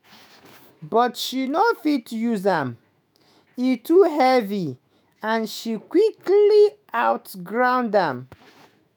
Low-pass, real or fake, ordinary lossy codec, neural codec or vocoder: none; fake; none; autoencoder, 48 kHz, 128 numbers a frame, DAC-VAE, trained on Japanese speech